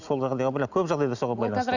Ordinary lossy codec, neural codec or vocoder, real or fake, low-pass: none; none; real; 7.2 kHz